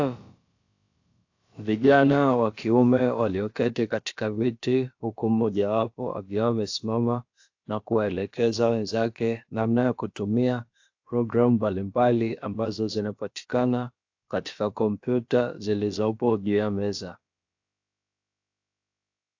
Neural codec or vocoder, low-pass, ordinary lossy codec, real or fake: codec, 16 kHz, about 1 kbps, DyCAST, with the encoder's durations; 7.2 kHz; AAC, 48 kbps; fake